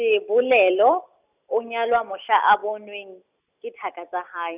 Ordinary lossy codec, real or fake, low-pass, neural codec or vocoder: none; real; 3.6 kHz; none